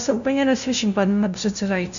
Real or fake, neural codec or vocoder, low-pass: fake; codec, 16 kHz, 0.5 kbps, FunCodec, trained on LibriTTS, 25 frames a second; 7.2 kHz